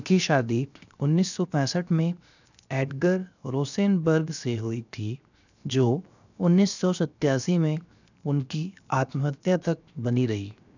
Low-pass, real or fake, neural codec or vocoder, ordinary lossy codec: 7.2 kHz; fake; codec, 16 kHz, 0.7 kbps, FocalCodec; none